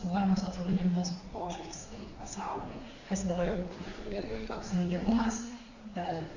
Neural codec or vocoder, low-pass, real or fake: codec, 24 kHz, 1 kbps, SNAC; 7.2 kHz; fake